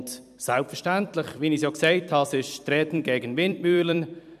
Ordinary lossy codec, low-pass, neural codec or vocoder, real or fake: none; 14.4 kHz; vocoder, 44.1 kHz, 128 mel bands every 256 samples, BigVGAN v2; fake